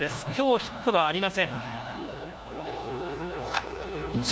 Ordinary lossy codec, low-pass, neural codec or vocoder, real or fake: none; none; codec, 16 kHz, 1 kbps, FunCodec, trained on LibriTTS, 50 frames a second; fake